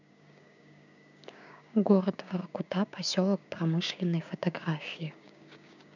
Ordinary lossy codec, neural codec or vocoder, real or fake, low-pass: none; codec, 16 kHz, 6 kbps, DAC; fake; 7.2 kHz